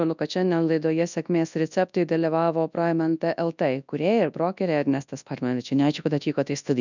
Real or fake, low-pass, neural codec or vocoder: fake; 7.2 kHz; codec, 24 kHz, 0.9 kbps, WavTokenizer, large speech release